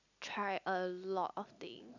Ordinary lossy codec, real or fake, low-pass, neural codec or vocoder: none; real; 7.2 kHz; none